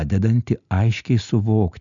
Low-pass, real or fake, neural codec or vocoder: 7.2 kHz; real; none